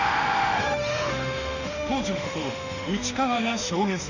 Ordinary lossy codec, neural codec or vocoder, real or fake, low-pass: none; autoencoder, 48 kHz, 32 numbers a frame, DAC-VAE, trained on Japanese speech; fake; 7.2 kHz